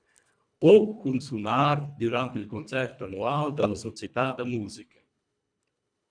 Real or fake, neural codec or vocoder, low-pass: fake; codec, 24 kHz, 1.5 kbps, HILCodec; 9.9 kHz